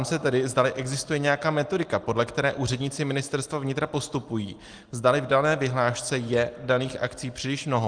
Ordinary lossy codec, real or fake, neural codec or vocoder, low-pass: Opus, 24 kbps; real; none; 9.9 kHz